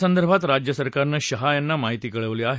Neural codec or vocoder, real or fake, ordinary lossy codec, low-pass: none; real; none; none